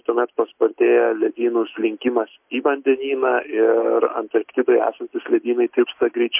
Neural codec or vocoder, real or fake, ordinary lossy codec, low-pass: none; real; MP3, 32 kbps; 3.6 kHz